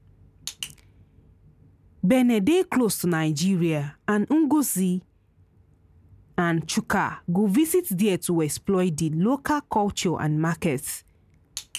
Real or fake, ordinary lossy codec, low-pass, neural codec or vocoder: real; none; 14.4 kHz; none